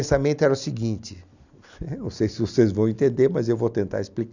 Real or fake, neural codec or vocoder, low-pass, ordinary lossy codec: real; none; 7.2 kHz; none